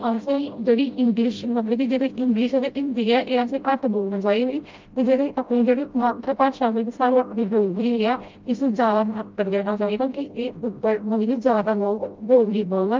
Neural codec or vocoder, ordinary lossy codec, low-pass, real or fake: codec, 16 kHz, 0.5 kbps, FreqCodec, smaller model; Opus, 24 kbps; 7.2 kHz; fake